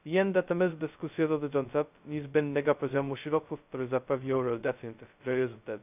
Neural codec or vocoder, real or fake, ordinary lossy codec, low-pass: codec, 16 kHz, 0.2 kbps, FocalCodec; fake; none; 3.6 kHz